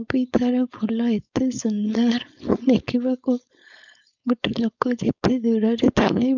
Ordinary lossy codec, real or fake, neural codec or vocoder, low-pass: none; fake; codec, 16 kHz, 4.8 kbps, FACodec; 7.2 kHz